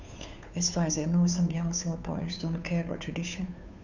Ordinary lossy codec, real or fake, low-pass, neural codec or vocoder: none; fake; 7.2 kHz; codec, 16 kHz, 2 kbps, FunCodec, trained on Chinese and English, 25 frames a second